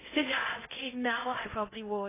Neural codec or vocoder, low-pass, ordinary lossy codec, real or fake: codec, 16 kHz in and 24 kHz out, 0.8 kbps, FocalCodec, streaming, 65536 codes; 3.6 kHz; AAC, 16 kbps; fake